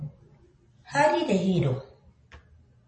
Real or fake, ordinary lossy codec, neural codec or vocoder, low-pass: real; MP3, 32 kbps; none; 9.9 kHz